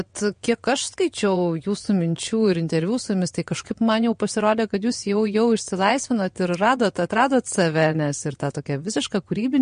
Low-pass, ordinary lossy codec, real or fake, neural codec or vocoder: 9.9 kHz; MP3, 48 kbps; fake; vocoder, 22.05 kHz, 80 mel bands, WaveNeXt